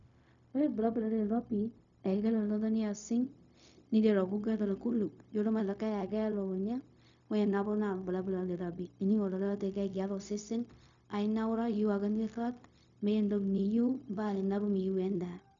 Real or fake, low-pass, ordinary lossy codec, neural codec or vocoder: fake; 7.2 kHz; none; codec, 16 kHz, 0.4 kbps, LongCat-Audio-Codec